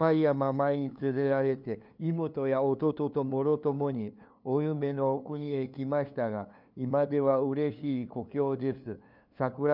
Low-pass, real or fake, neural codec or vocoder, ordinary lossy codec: 5.4 kHz; fake; codec, 16 kHz, 2 kbps, FunCodec, trained on LibriTTS, 25 frames a second; none